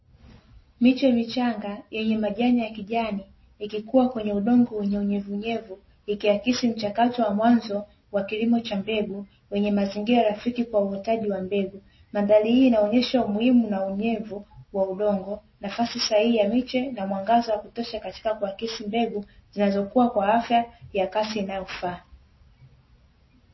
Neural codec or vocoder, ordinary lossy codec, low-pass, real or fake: none; MP3, 24 kbps; 7.2 kHz; real